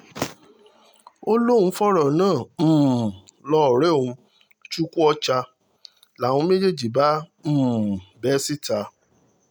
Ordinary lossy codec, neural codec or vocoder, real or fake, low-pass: none; none; real; none